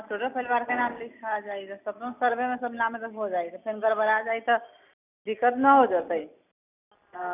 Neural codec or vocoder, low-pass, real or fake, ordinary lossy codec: none; 3.6 kHz; real; none